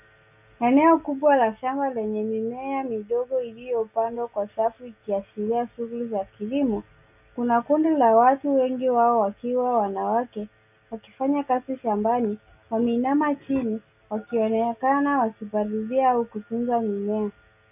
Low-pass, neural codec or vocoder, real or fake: 3.6 kHz; none; real